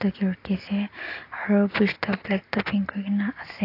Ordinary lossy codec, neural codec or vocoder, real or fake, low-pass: AAC, 32 kbps; none; real; 5.4 kHz